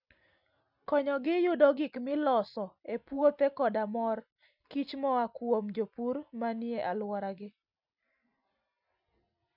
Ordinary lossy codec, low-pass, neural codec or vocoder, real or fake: none; 5.4 kHz; none; real